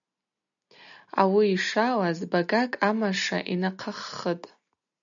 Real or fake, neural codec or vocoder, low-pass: real; none; 7.2 kHz